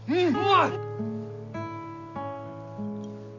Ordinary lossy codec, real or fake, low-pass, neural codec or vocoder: AAC, 48 kbps; real; 7.2 kHz; none